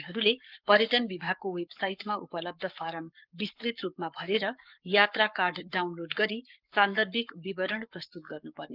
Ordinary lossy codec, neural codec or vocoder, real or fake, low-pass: Opus, 24 kbps; codec, 44.1 kHz, 7.8 kbps, Pupu-Codec; fake; 5.4 kHz